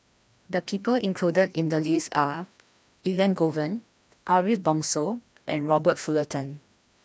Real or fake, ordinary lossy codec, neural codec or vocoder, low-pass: fake; none; codec, 16 kHz, 1 kbps, FreqCodec, larger model; none